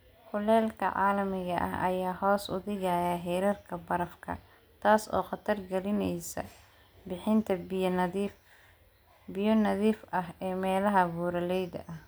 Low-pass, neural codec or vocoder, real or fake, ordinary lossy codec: none; none; real; none